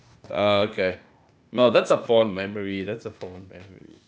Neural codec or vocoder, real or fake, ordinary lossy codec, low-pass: codec, 16 kHz, 0.8 kbps, ZipCodec; fake; none; none